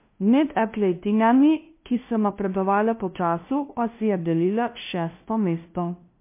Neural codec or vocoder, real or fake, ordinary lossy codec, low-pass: codec, 16 kHz, 0.5 kbps, FunCodec, trained on LibriTTS, 25 frames a second; fake; MP3, 24 kbps; 3.6 kHz